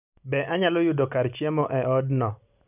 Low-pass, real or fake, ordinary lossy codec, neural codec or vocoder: 3.6 kHz; real; none; none